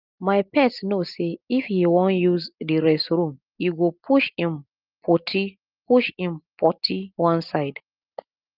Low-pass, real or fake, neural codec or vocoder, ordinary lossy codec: 5.4 kHz; real; none; Opus, 32 kbps